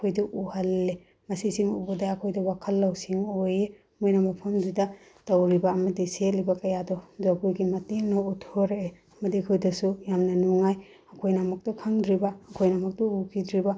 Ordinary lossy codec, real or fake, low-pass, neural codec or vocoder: none; real; none; none